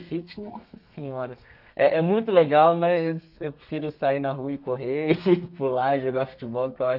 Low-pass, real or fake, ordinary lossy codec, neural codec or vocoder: 5.4 kHz; fake; none; codec, 32 kHz, 1.9 kbps, SNAC